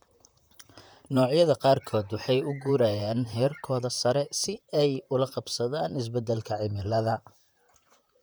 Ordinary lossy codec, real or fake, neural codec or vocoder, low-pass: none; fake; vocoder, 44.1 kHz, 128 mel bands, Pupu-Vocoder; none